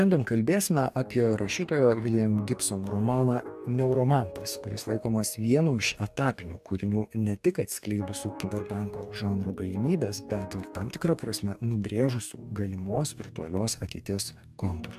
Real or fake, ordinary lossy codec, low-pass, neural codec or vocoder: fake; AAC, 96 kbps; 14.4 kHz; codec, 44.1 kHz, 2.6 kbps, DAC